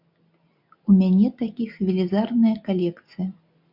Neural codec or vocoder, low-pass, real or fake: none; 5.4 kHz; real